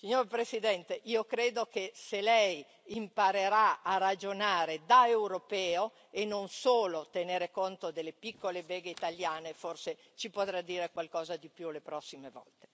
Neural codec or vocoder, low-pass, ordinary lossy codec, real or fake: none; none; none; real